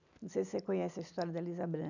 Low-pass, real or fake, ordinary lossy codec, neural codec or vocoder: 7.2 kHz; real; none; none